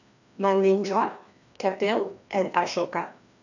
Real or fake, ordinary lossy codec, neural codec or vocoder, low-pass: fake; none; codec, 16 kHz, 1 kbps, FreqCodec, larger model; 7.2 kHz